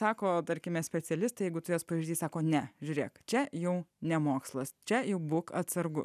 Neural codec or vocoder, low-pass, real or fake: none; 14.4 kHz; real